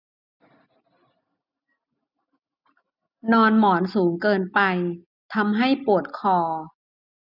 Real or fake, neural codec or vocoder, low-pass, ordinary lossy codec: real; none; 5.4 kHz; none